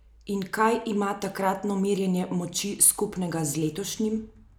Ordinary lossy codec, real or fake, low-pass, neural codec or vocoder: none; real; none; none